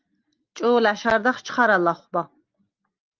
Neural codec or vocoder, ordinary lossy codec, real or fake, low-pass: none; Opus, 32 kbps; real; 7.2 kHz